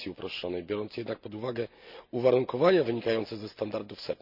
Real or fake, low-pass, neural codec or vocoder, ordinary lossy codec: real; 5.4 kHz; none; none